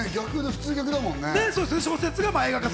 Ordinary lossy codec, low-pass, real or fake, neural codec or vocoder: none; none; real; none